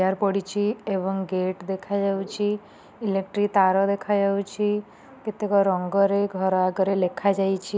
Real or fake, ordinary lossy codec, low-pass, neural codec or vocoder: real; none; none; none